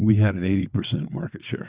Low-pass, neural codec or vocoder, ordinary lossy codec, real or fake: 3.6 kHz; vocoder, 22.05 kHz, 80 mel bands, WaveNeXt; Opus, 64 kbps; fake